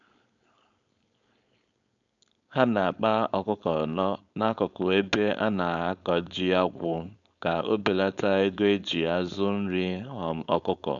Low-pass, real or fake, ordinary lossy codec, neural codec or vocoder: 7.2 kHz; fake; none; codec, 16 kHz, 4.8 kbps, FACodec